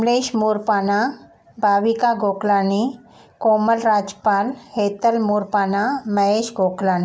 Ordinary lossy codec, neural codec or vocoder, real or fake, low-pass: none; none; real; none